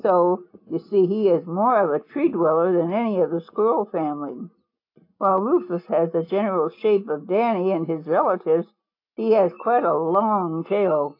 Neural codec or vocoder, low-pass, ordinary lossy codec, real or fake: none; 5.4 kHz; AAC, 32 kbps; real